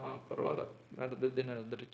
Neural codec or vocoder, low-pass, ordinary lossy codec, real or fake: codec, 16 kHz, 0.9 kbps, LongCat-Audio-Codec; none; none; fake